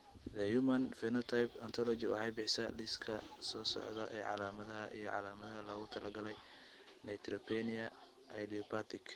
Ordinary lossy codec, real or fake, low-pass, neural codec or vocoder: Opus, 16 kbps; fake; 19.8 kHz; autoencoder, 48 kHz, 128 numbers a frame, DAC-VAE, trained on Japanese speech